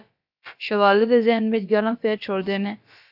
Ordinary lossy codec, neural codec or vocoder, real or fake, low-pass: AAC, 48 kbps; codec, 16 kHz, about 1 kbps, DyCAST, with the encoder's durations; fake; 5.4 kHz